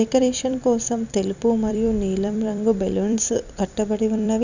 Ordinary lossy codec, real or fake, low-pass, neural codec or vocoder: none; fake; 7.2 kHz; vocoder, 44.1 kHz, 128 mel bands every 256 samples, BigVGAN v2